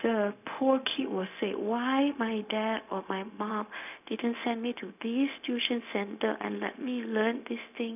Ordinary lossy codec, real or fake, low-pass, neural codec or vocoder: none; fake; 3.6 kHz; codec, 16 kHz, 0.4 kbps, LongCat-Audio-Codec